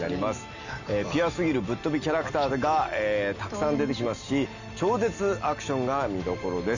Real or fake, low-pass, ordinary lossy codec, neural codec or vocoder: real; 7.2 kHz; none; none